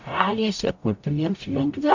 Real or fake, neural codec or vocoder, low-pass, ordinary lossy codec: fake; codec, 44.1 kHz, 0.9 kbps, DAC; 7.2 kHz; MP3, 64 kbps